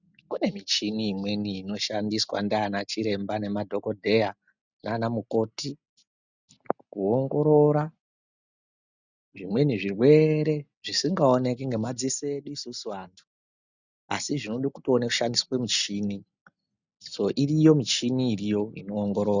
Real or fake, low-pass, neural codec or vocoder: real; 7.2 kHz; none